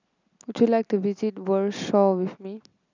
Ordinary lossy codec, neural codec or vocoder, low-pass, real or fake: none; none; 7.2 kHz; real